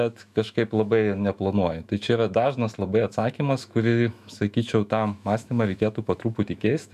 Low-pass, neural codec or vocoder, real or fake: 14.4 kHz; autoencoder, 48 kHz, 128 numbers a frame, DAC-VAE, trained on Japanese speech; fake